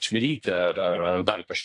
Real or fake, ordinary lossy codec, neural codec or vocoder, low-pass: fake; AAC, 64 kbps; codec, 24 kHz, 1 kbps, SNAC; 10.8 kHz